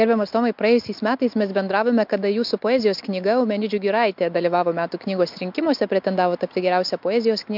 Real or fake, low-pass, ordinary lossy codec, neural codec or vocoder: real; 5.4 kHz; MP3, 48 kbps; none